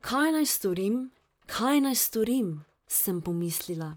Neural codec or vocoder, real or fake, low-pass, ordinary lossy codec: vocoder, 44.1 kHz, 128 mel bands, Pupu-Vocoder; fake; none; none